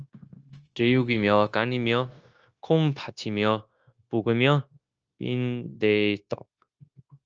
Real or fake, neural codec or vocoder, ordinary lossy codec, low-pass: fake; codec, 16 kHz, 0.9 kbps, LongCat-Audio-Codec; Opus, 24 kbps; 7.2 kHz